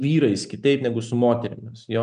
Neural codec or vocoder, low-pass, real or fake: none; 9.9 kHz; real